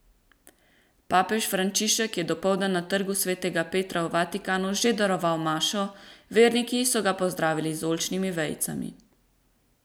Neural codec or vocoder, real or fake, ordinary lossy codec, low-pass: none; real; none; none